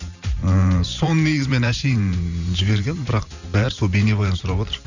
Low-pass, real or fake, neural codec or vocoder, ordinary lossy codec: 7.2 kHz; fake; vocoder, 44.1 kHz, 128 mel bands every 512 samples, BigVGAN v2; none